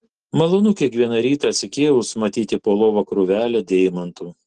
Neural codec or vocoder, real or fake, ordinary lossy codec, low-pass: none; real; Opus, 16 kbps; 10.8 kHz